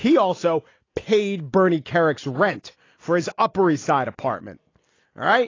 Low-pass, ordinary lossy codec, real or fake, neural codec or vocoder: 7.2 kHz; AAC, 32 kbps; real; none